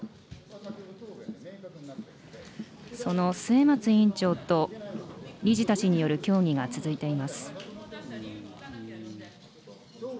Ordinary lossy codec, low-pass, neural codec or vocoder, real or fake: none; none; none; real